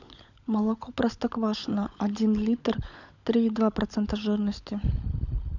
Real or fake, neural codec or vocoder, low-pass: fake; codec, 16 kHz, 16 kbps, FunCodec, trained on LibriTTS, 50 frames a second; 7.2 kHz